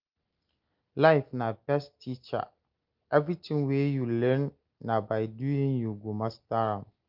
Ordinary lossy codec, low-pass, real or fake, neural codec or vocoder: Opus, 32 kbps; 5.4 kHz; real; none